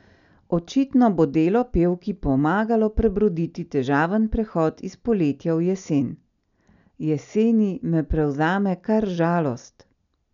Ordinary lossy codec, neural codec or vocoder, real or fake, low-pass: none; none; real; 7.2 kHz